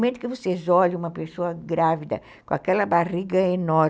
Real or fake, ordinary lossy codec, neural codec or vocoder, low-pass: real; none; none; none